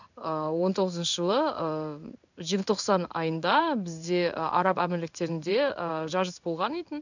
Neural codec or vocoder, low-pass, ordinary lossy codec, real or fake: codec, 16 kHz in and 24 kHz out, 1 kbps, XY-Tokenizer; 7.2 kHz; none; fake